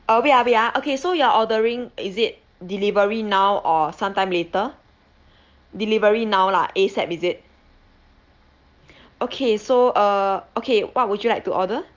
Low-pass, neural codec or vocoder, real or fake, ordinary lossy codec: 7.2 kHz; none; real; Opus, 24 kbps